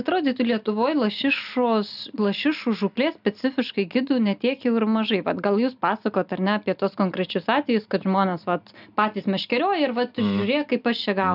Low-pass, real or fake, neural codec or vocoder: 5.4 kHz; real; none